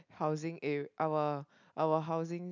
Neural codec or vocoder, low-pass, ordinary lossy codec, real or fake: none; 7.2 kHz; none; real